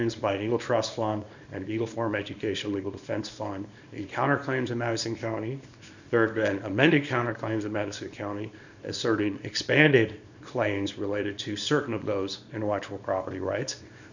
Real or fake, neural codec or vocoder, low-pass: fake; codec, 24 kHz, 0.9 kbps, WavTokenizer, small release; 7.2 kHz